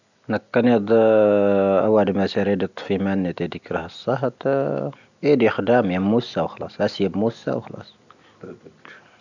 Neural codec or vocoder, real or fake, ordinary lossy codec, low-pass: none; real; none; 7.2 kHz